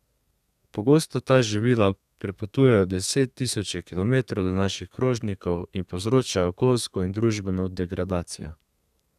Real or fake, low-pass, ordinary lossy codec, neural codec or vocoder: fake; 14.4 kHz; none; codec, 32 kHz, 1.9 kbps, SNAC